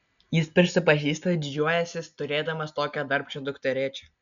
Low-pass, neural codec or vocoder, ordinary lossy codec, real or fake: 7.2 kHz; none; MP3, 96 kbps; real